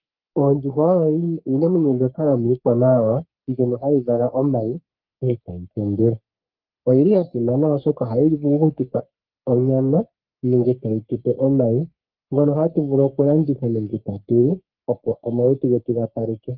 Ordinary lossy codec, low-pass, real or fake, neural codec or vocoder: Opus, 24 kbps; 5.4 kHz; fake; codec, 44.1 kHz, 3.4 kbps, Pupu-Codec